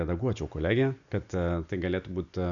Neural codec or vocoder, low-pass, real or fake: none; 7.2 kHz; real